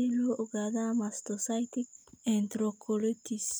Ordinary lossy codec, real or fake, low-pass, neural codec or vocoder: none; real; none; none